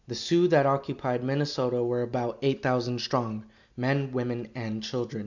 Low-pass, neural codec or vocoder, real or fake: 7.2 kHz; none; real